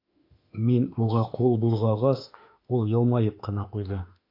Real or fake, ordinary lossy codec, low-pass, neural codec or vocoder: fake; AAC, 32 kbps; 5.4 kHz; autoencoder, 48 kHz, 32 numbers a frame, DAC-VAE, trained on Japanese speech